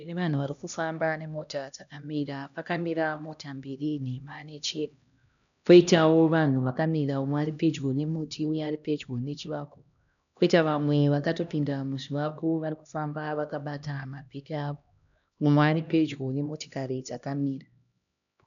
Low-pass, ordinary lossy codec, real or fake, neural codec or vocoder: 7.2 kHz; MP3, 96 kbps; fake; codec, 16 kHz, 1 kbps, X-Codec, HuBERT features, trained on LibriSpeech